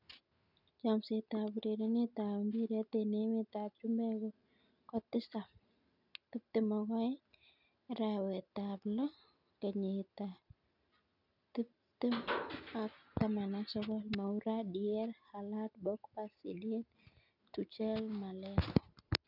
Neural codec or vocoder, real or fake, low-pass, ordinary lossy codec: none; real; 5.4 kHz; none